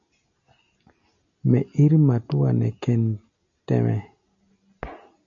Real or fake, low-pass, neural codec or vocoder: real; 7.2 kHz; none